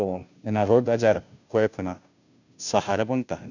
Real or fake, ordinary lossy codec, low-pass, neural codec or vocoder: fake; none; 7.2 kHz; codec, 16 kHz, 0.5 kbps, FunCodec, trained on Chinese and English, 25 frames a second